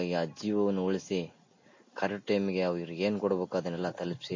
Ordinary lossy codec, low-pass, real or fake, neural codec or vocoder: MP3, 32 kbps; 7.2 kHz; real; none